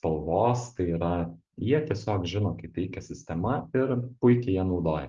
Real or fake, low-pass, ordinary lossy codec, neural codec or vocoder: real; 7.2 kHz; Opus, 24 kbps; none